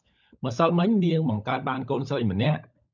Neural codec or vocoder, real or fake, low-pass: codec, 16 kHz, 16 kbps, FunCodec, trained on LibriTTS, 50 frames a second; fake; 7.2 kHz